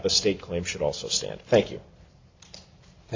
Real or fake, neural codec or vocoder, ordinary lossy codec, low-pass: real; none; MP3, 64 kbps; 7.2 kHz